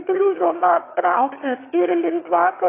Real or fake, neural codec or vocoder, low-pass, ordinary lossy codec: fake; autoencoder, 22.05 kHz, a latent of 192 numbers a frame, VITS, trained on one speaker; 3.6 kHz; AAC, 24 kbps